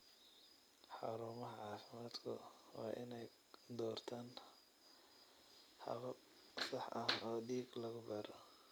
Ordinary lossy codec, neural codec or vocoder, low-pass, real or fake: none; none; none; real